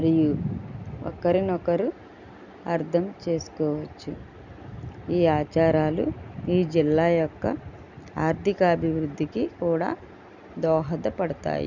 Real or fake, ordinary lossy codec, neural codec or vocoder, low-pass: real; none; none; 7.2 kHz